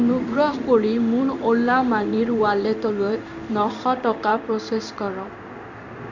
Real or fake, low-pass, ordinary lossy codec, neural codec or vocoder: fake; 7.2 kHz; none; codec, 16 kHz in and 24 kHz out, 1 kbps, XY-Tokenizer